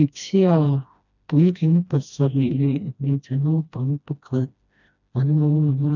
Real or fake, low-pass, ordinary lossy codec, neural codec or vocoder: fake; 7.2 kHz; none; codec, 16 kHz, 1 kbps, FreqCodec, smaller model